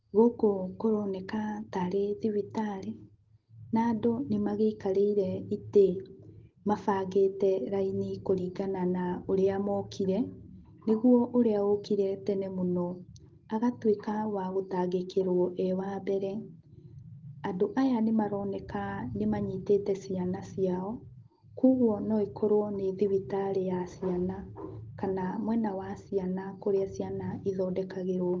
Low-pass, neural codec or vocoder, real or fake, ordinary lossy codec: 7.2 kHz; none; real; Opus, 16 kbps